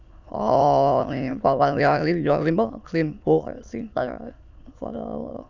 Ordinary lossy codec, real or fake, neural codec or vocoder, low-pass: none; fake; autoencoder, 22.05 kHz, a latent of 192 numbers a frame, VITS, trained on many speakers; 7.2 kHz